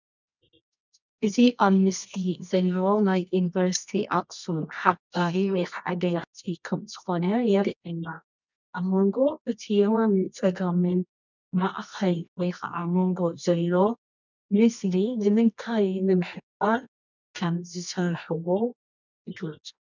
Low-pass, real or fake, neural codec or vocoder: 7.2 kHz; fake; codec, 24 kHz, 0.9 kbps, WavTokenizer, medium music audio release